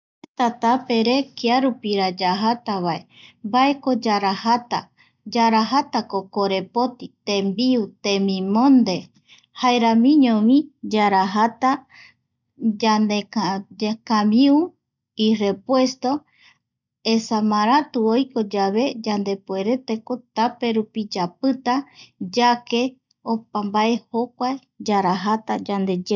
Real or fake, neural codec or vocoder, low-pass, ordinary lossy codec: real; none; 7.2 kHz; none